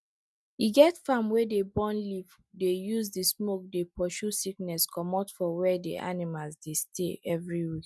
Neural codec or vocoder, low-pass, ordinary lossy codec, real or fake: none; none; none; real